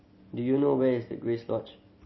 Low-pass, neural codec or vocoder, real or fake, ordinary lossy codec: 7.2 kHz; none; real; MP3, 24 kbps